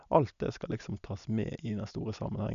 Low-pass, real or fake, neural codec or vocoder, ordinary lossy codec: 7.2 kHz; real; none; none